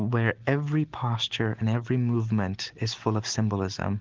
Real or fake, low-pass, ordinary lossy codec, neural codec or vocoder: real; 7.2 kHz; Opus, 16 kbps; none